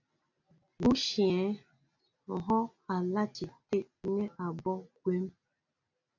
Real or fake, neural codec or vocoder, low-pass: real; none; 7.2 kHz